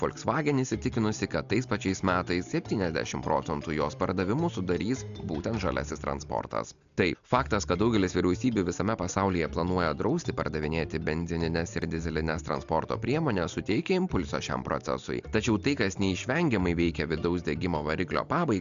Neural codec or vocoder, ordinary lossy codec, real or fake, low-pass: none; AAC, 96 kbps; real; 7.2 kHz